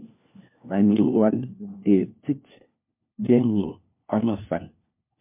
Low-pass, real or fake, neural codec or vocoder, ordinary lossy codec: 3.6 kHz; fake; codec, 16 kHz, 1 kbps, FunCodec, trained on LibriTTS, 50 frames a second; MP3, 32 kbps